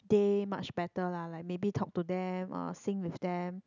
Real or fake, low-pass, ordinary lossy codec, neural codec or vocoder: real; 7.2 kHz; none; none